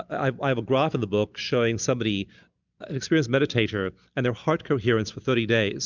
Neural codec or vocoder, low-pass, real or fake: none; 7.2 kHz; real